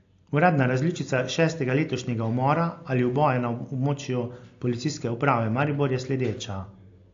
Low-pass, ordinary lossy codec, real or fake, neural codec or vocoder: 7.2 kHz; AAC, 48 kbps; real; none